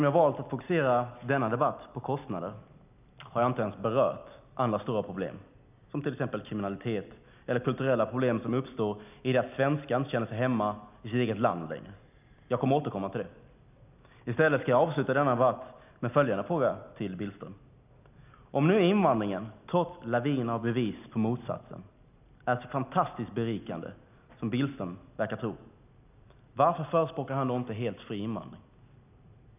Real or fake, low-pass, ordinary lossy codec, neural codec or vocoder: real; 3.6 kHz; none; none